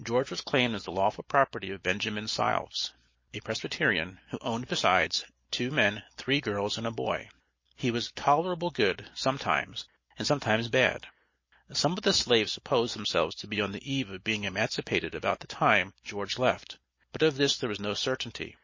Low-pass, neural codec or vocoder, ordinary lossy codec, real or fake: 7.2 kHz; none; MP3, 32 kbps; real